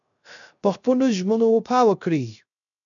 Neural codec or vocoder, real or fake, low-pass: codec, 16 kHz, 0.3 kbps, FocalCodec; fake; 7.2 kHz